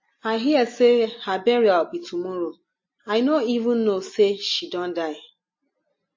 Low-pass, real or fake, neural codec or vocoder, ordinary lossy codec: 7.2 kHz; real; none; MP3, 32 kbps